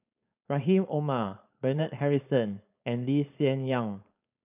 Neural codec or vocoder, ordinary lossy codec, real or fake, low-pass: codec, 16 kHz, 4.8 kbps, FACodec; none; fake; 3.6 kHz